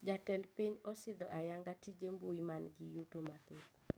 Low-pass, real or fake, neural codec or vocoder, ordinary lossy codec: none; fake; codec, 44.1 kHz, 7.8 kbps, DAC; none